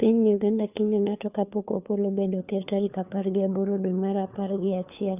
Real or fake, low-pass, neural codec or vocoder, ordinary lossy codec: fake; 3.6 kHz; codec, 16 kHz, 4 kbps, FunCodec, trained on LibriTTS, 50 frames a second; none